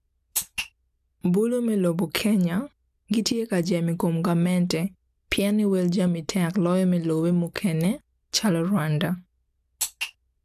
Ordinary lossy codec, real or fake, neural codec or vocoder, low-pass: AAC, 96 kbps; real; none; 14.4 kHz